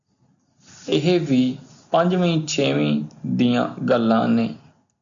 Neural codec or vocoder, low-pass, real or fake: none; 7.2 kHz; real